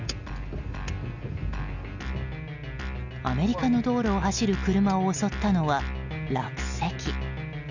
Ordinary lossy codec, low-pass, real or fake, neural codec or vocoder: none; 7.2 kHz; real; none